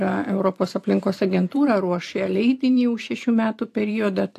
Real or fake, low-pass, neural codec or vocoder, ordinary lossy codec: fake; 14.4 kHz; vocoder, 44.1 kHz, 128 mel bands every 512 samples, BigVGAN v2; AAC, 64 kbps